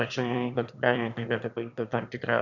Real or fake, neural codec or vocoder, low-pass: fake; autoencoder, 22.05 kHz, a latent of 192 numbers a frame, VITS, trained on one speaker; 7.2 kHz